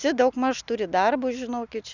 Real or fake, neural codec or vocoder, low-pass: real; none; 7.2 kHz